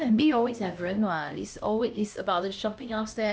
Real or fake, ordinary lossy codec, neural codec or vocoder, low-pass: fake; none; codec, 16 kHz, 1 kbps, X-Codec, HuBERT features, trained on LibriSpeech; none